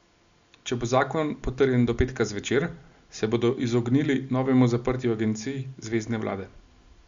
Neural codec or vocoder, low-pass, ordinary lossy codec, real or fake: none; 7.2 kHz; Opus, 64 kbps; real